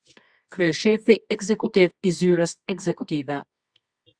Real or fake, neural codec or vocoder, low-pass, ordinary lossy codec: fake; codec, 24 kHz, 0.9 kbps, WavTokenizer, medium music audio release; 9.9 kHz; Opus, 64 kbps